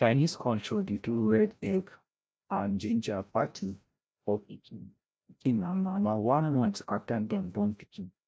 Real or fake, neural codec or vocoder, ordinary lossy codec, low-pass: fake; codec, 16 kHz, 0.5 kbps, FreqCodec, larger model; none; none